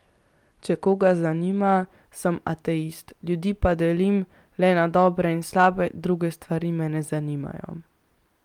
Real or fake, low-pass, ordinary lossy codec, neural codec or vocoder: fake; 19.8 kHz; Opus, 32 kbps; vocoder, 44.1 kHz, 128 mel bands every 256 samples, BigVGAN v2